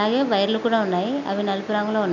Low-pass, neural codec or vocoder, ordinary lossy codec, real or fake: 7.2 kHz; none; none; real